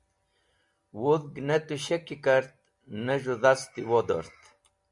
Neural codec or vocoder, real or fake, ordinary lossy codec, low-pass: none; real; MP3, 64 kbps; 10.8 kHz